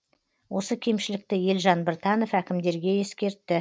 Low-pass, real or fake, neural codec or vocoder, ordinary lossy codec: none; real; none; none